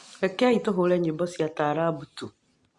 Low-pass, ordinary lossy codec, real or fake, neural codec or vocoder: 10.8 kHz; Opus, 64 kbps; real; none